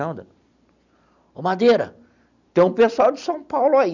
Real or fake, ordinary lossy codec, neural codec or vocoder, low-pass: real; none; none; 7.2 kHz